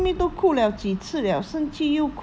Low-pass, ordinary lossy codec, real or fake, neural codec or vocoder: none; none; real; none